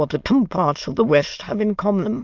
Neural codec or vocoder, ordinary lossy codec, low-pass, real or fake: autoencoder, 22.05 kHz, a latent of 192 numbers a frame, VITS, trained on many speakers; Opus, 24 kbps; 7.2 kHz; fake